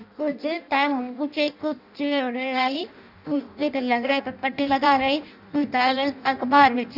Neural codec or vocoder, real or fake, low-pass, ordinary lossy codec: codec, 16 kHz in and 24 kHz out, 0.6 kbps, FireRedTTS-2 codec; fake; 5.4 kHz; none